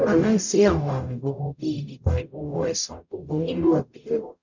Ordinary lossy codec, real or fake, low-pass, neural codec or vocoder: none; fake; 7.2 kHz; codec, 44.1 kHz, 0.9 kbps, DAC